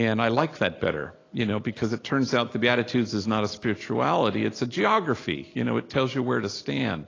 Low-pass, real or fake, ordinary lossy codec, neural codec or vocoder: 7.2 kHz; real; AAC, 32 kbps; none